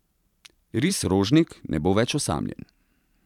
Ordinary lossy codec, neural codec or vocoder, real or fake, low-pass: none; vocoder, 44.1 kHz, 128 mel bands every 512 samples, BigVGAN v2; fake; 19.8 kHz